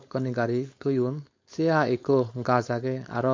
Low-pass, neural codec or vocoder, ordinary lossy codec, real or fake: 7.2 kHz; codec, 16 kHz, 4.8 kbps, FACodec; MP3, 48 kbps; fake